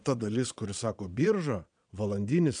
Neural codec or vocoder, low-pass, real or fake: vocoder, 22.05 kHz, 80 mel bands, Vocos; 9.9 kHz; fake